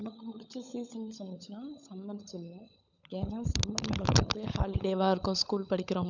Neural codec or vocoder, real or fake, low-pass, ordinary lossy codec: codec, 16 kHz, 16 kbps, FunCodec, trained on LibriTTS, 50 frames a second; fake; 7.2 kHz; none